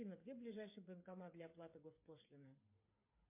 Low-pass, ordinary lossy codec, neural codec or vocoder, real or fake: 3.6 kHz; MP3, 24 kbps; codec, 16 kHz, 8 kbps, FreqCodec, smaller model; fake